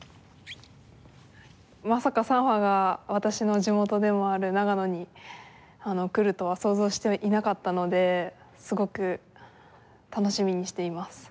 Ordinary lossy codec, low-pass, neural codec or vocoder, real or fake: none; none; none; real